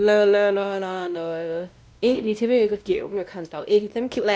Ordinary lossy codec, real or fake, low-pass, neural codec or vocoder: none; fake; none; codec, 16 kHz, 1 kbps, X-Codec, WavLM features, trained on Multilingual LibriSpeech